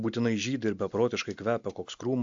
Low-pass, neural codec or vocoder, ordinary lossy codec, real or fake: 7.2 kHz; none; AAC, 64 kbps; real